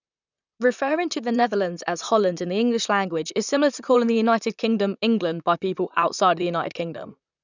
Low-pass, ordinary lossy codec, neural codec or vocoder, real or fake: 7.2 kHz; none; vocoder, 44.1 kHz, 128 mel bands, Pupu-Vocoder; fake